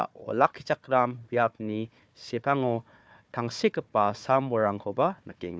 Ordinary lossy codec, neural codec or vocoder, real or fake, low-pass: none; codec, 16 kHz, 4 kbps, FunCodec, trained on Chinese and English, 50 frames a second; fake; none